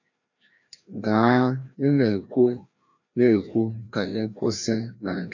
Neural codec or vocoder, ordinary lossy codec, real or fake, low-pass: codec, 16 kHz, 1 kbps, FreqCodec, larger model; none; fake; 7.2 kHz